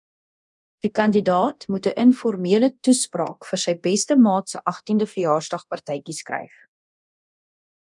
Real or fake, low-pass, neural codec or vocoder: fake; 10.8 kHz; codec, 24 kHz, 0.9 kbps, DualCodec